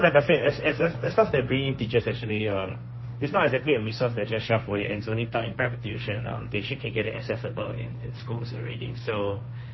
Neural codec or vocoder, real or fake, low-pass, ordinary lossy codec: codec, 16 kHz, 1.1 kbps, Voila-Tokenizer; fake; 7.2 kHz; MP3, 24 kbps